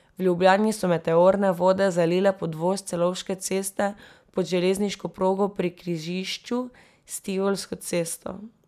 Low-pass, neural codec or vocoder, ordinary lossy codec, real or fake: 14.4 kHz; none; none; real